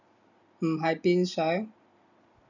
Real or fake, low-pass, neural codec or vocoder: real; 7.2 kHz; none